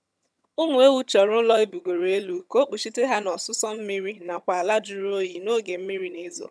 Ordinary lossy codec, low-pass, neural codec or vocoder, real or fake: none; none; vocoder, 22.05 kHz, 80 mel bands, HiFi-GAN; fake